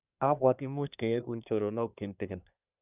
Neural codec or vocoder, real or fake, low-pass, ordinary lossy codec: codec, 24 kHz, 1 kbps, SNAC; fake; 3.6 kHz; none